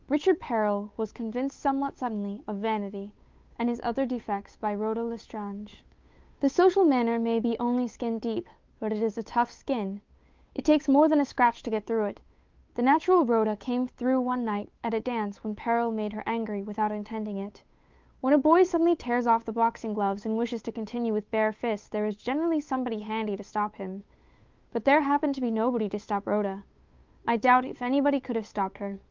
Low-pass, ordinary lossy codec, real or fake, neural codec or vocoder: 7.2 kHz; Opus, 24 kbps; fake; codec, 16 kHz, 8 kbps, FunCodec, trained on Chinese and English, 25 frames a second